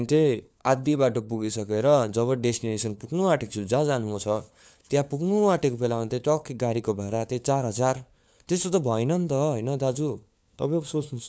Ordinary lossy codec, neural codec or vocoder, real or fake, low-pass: none; codec, 16 kHz, 4 kbps, FunCodec, trained on LibriTTS, 50 frames a second; fake; none